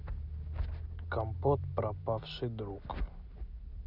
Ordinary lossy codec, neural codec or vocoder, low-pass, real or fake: none; none; 5.4 kHz; real